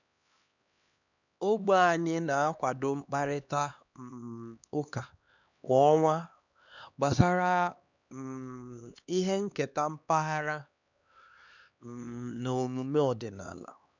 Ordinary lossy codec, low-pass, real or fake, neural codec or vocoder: none; 7.2 kHz; fake; codec, 16 kHz, 2 kbps, X-Codec, HuBERT features, trained on LibriSpeech